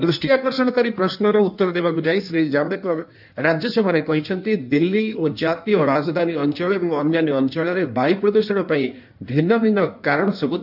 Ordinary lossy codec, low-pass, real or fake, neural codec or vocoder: none; 5.4 kHz; fake; codec, 16 kHz in and 24 kHz out, 1.1 kbps, FireRedTTS-2 codec